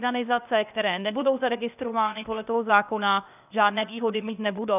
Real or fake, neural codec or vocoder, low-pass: fake; codec, 16 kHz, 0.8 kbps, ZipCodec; 3.6 kHz